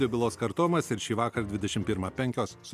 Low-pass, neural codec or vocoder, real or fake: 14.4 kHz; none; real